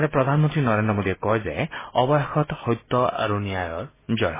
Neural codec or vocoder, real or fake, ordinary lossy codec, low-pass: none; real; MP3, 16 kbps; 3.6 kHz